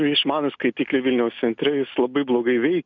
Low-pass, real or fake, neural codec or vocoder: 7.2 kHz; real; none